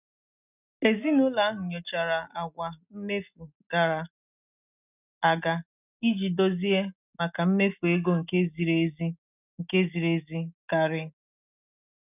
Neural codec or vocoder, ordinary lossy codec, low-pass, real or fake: none; none; 3.6 kHz; real